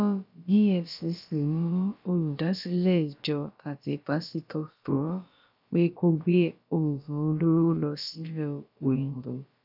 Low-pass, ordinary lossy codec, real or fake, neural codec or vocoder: 5.4 kHz; none; fake; codec, 16 kHz, about 1 kbps, DyCAST, with the encoder's durations